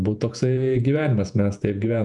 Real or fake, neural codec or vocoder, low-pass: fake; vocoder, 48 kHz, 128 mel bands, Vocos; 10.8 kHz